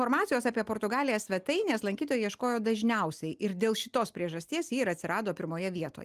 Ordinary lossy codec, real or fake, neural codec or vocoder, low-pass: Opus, 32 kbps; real; none; 14.4 kHz